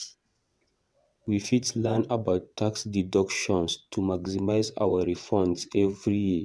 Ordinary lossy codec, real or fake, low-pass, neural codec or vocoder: none; fake; none; vocoder, 22.05 kHz, 80 mel bands, WaveNeXt